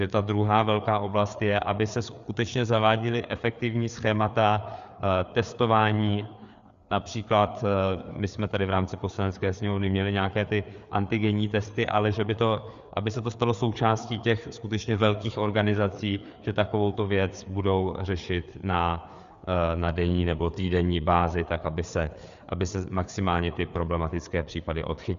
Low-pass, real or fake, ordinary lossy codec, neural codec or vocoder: 7.2 kHz; fake; Opus, 64 kbps; codec, 16 kHz, 4 kbps, FreqCodec, larger model